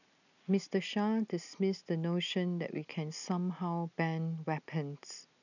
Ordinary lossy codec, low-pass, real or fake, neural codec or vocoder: none; 7.2 kHz; real; none